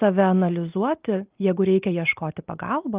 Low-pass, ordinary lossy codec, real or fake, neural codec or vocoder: 3.6 kHz; Opus, 32 kbps; real; none